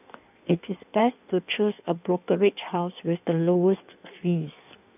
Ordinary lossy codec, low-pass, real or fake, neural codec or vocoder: none; 3.6 kHz; fake; codec, 16 kHz in and 24 kHz out, 1.1 kbps, FireRedTTS-2 codec